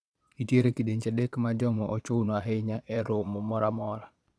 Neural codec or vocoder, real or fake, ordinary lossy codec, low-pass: vocoder, 22.05 kHz, 80 mel bands, Vocos; fake; none; none